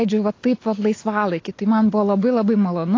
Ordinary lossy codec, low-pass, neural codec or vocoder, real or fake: AAC, 32 kbps; 7.2 kHz; none; real